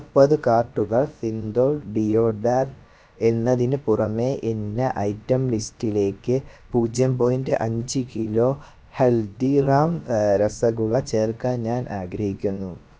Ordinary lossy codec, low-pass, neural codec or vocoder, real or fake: none; none; codec, 16 kHz, about 1 kbps, DyCAST, with the encoder's durations; fake